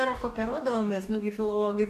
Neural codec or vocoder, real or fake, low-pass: codec, 44.1 kHz, 2.6 kbps, DAC; fake; 14.4 kHz